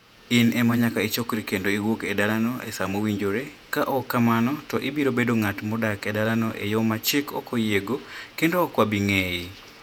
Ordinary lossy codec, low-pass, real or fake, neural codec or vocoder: none; 19.8 kHz; fake; vocoder, 48 kHz, 128 mel bands, Vocos